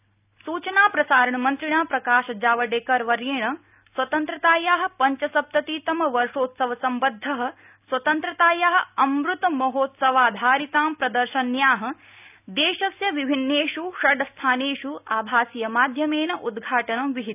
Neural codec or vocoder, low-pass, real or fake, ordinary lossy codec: none; 3.6 kHz; real; none